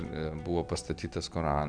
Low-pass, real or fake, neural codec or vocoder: 9.9 kHz; real; none